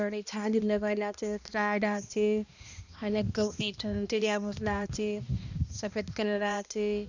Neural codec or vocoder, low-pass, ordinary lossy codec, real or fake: codec, 16 kHz, 1 kbps, X-Codec, HuBERT features, trained on balanced general audio; 7.2 kHz; none; fake